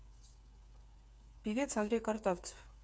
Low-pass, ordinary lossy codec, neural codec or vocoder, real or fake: none; none; codec, 16 kHz, 8 kbps, FreqCodec, smaller model; fake